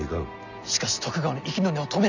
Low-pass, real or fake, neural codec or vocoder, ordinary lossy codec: 7.2 kHz; real; none; none